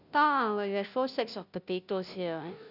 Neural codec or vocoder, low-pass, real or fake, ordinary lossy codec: codec, 16 kHz, 0.5 kbps, FunCodec, trained on Chinese and English, 25 frames a second; 5.4 kHz; fake; none